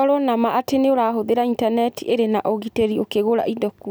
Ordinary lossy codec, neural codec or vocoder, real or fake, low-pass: none; none; real; none